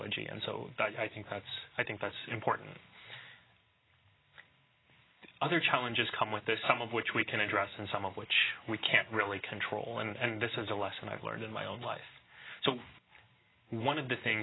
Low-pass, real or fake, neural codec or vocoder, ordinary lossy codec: 7.2 kHz; real; none; AAC, 16 kbps